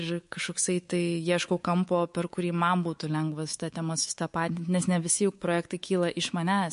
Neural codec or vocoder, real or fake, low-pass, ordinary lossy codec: codec, 24 kHz, 3.1 kbps, DualCodec; fake; 10.8 kHz; MP3, 48 kbps